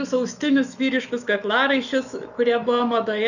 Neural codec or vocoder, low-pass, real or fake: autoencoder, 48 kHz, 128 numbers a frame, DAC-VAE, trained on Japanese speech; 7.2 kHz; fake